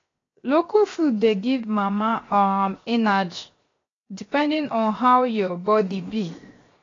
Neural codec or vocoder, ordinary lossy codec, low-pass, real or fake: codec, 16 kHz, 0.7 kbps, FocalCodec; AAC, 32 kbps; 7.2 kHz; fake